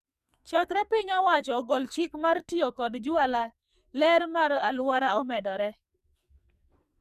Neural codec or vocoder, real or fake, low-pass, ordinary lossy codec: codec, 44.1 kHz, 2.6 kbps, SNAC; fake; 14.4 kHz; none